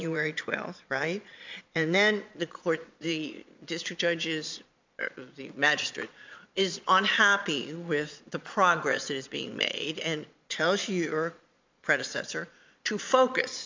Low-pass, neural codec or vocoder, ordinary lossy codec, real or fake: 7.2 kHz; vocoder, 22.05 kHz, 80 mel bands, Vocos; MP3, 64 kbps; fake